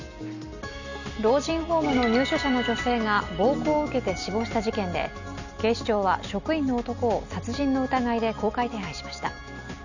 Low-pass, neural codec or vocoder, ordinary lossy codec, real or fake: 7.2 kHz; none; none; real